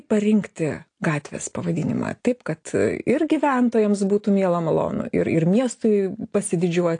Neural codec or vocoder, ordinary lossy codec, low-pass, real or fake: none; AAC, 48 kbps; 9.9 kHz; real